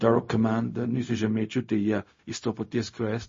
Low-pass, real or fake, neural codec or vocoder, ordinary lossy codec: 7.2 kHz; fake; codec, 16 kHz, 0.4 kbps, LongCat-Audio-Codec; MP3, 32 kbps